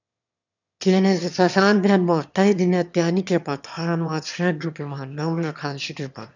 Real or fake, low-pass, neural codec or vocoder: fake; 7.2 kHz; autoencoder, 22.05 kHz, a latent of 192 numbers a frame, VITS, trained on one speaker